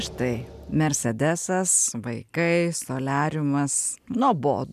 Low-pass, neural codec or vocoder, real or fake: 14.4 kHz; none; real